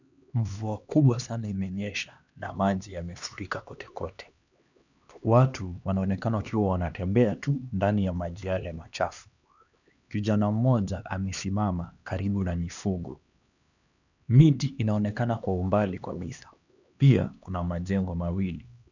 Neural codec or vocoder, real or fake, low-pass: codec, 16 kHz, 2 kbps, X-Codec, HuBERT features, trained on LibriSpeech; fake; 7.2 kHz